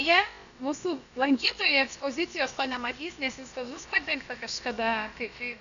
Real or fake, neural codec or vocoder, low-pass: fake; codec, 16 kHz, about 1 kbps, DyCAST, with the encoder's durations; 7.2 kHz